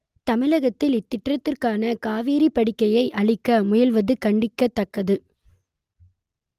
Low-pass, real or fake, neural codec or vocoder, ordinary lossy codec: 14.4 kHz; real; none; Opus, 32 kbps